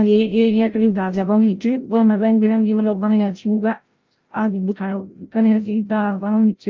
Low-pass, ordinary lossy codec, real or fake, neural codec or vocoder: 7.2 kHz; Opus, 24 kbps; fake; codec, 16 kHz, 0.5 kbps, FreqCodec, larger model